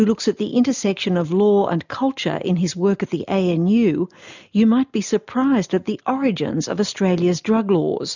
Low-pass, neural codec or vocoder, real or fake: 7.2 kHz; none; real